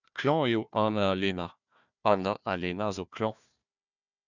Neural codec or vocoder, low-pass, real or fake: codec, 16 kHz, 1 kbps, FunCodec, trained on Chinese and English, 50 frames a second; 7.2 kHz; fake